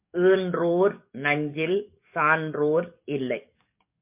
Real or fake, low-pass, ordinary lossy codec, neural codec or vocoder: fake; 3.6 kHz; MP3, 32 kbps; codec, 44.1 kHz, 7.8 kbps, DAC